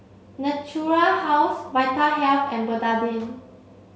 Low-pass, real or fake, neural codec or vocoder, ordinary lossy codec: none; real; none; none